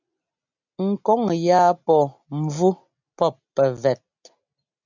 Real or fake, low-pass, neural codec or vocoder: real; 7.2 kHz; none